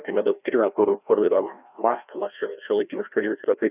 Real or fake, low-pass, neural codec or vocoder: fake; 3.6 kHz; codec, 16 kHz, 1 kbps, FreqCodec, larger model